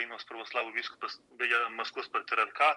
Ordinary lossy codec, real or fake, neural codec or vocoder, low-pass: MP3, 64 kbps; real; none; 10.8 kHz